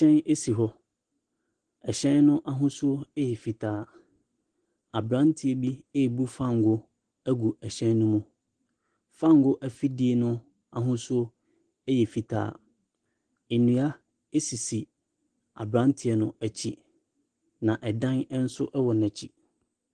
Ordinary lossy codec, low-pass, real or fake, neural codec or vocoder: Opus, 16 kbps; 9.9 kHz; real; none